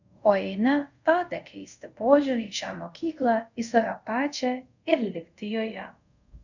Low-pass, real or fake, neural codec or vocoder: 7.2 kHz; fake; codec, 24 kHz, 0.5 kbps, DualCodec